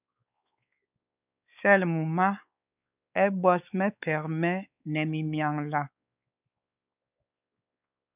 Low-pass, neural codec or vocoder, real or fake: 3.6 kHz; codec, 16 kHz, 4 kbps, X-Codec, WavLM features, trained on Multilingual LibriSpeech; fake